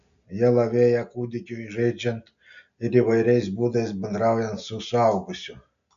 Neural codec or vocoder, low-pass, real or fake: none; 7.2 kHz; real